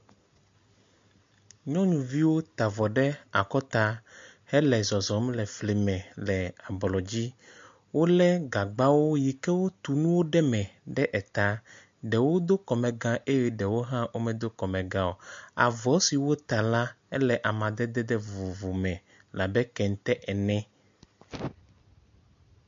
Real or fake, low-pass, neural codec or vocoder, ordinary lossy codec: real; 7.2 kHz; none; MP3, 48 kbps